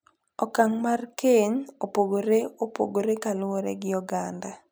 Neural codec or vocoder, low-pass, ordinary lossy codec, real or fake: none; none; none; real